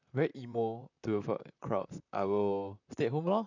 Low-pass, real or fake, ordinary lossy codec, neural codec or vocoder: 7.2 kHz; fake; none; vocoder, 44.1 kHz, 128 mel bands, Pupu-Vocoder